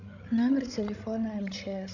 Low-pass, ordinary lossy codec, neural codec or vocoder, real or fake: 7.2 kHz; none; codec, 16 kHz, 16 kbps, FreqCodec, larger model; fake